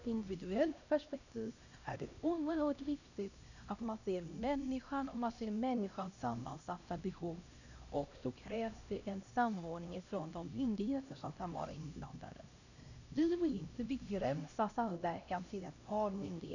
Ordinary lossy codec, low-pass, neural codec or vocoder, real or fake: none; 7.2 kHz; codec, 16 kHz, 1 kbps, X-Codec, HuBERT features, trained on LibriSpeech; fake